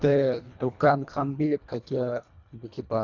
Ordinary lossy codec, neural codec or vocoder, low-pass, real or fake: none; codec, 24 kHz, 1.5 kbps, HILCodec; 7.2 kHz; fake